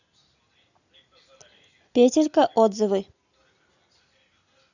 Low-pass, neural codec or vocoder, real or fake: 7.2 kHz; none; real